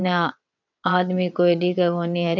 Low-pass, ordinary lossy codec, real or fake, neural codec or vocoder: 7.2 kHz; none; fake; vocoder, 22.05 kHz, 80 mel bands, WaveNeXt